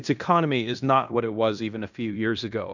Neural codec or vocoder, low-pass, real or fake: codec, 16 kHz in and 24 kHz out, 0.9 kbps, LongCat-Audio-Codec, fine tuned four codebook decoder; 7.2 kHz; fake